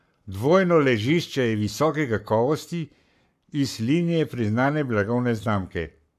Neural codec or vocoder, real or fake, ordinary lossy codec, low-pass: codec, 44.1 kHz, 7.8 kbps, Pupu-Codec; fake; MP3, 96 kbps; 14.4 kHz